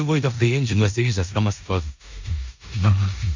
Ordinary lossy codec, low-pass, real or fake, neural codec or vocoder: MP3, 64 kbps; 7.2 kHz; fake; codec, 16 kHz in and 24 kHz out, 0.9 kbps, LongCat-Audio-Codec, four codebook decoder